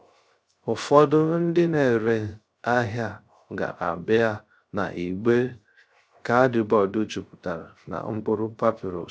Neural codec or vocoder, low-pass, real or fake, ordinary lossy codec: codec, 16 kHz, 0.3 kbps, FocalCodec; none; fake; none